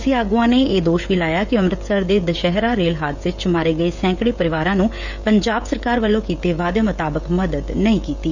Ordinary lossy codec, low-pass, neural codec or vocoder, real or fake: none; 7.2 kHz; codec, 16 kHz, 16 kbps, FreqCodec, smaller model; fake